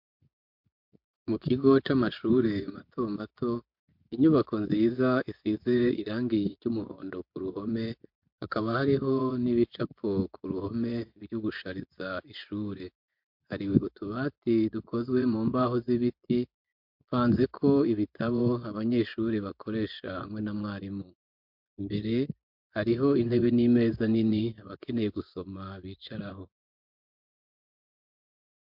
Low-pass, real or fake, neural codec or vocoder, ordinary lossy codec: 5.4 kHz; fake; vocoder, 24 kHz, 100 mel bands, Vocos; MP3, 48 kbps